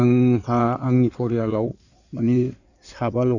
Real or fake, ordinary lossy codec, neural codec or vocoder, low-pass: fake; none; codec, 16 kHz in and 24 kHz out, 2.2 kbps, FireRedTTS-2 codec; 7.2 kHz